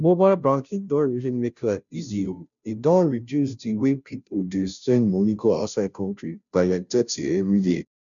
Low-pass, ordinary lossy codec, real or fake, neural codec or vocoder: 7.2 kHz; none; fake; codec, 16 kHz, 0.5 kbps, FunCodec, trained on Chinese and English, 25 frames a second